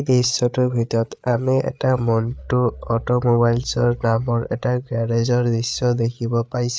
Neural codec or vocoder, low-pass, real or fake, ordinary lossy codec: codec, 16 kHz, 8 kbps, FreqCodec, larger model; none; fake; none